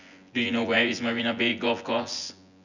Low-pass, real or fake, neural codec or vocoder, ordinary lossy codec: 7.2 kHz; fake; vocoder, 24 kHz, 100 mel bands, Vocos; none